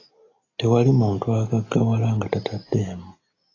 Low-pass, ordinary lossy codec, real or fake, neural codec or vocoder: 7.2 kHz; AAC, 32 kbps; real; none